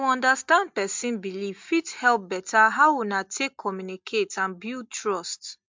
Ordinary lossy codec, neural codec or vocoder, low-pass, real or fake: MP3, 64 kbps; none; 7.2 kHz; real